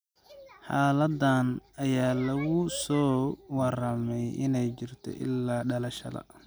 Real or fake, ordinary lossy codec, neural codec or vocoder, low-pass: real; none; none; none